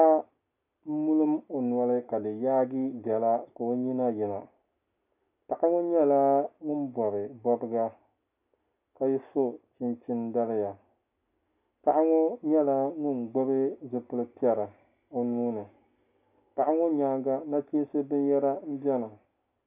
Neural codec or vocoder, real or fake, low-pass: none; real; 3.6 kHz